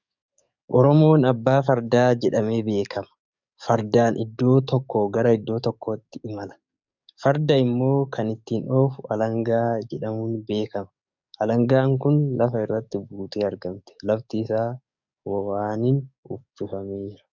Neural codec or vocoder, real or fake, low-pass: codec, 44.1 kHz, 7.8 kbps, DAC; fake; 7.2 kHz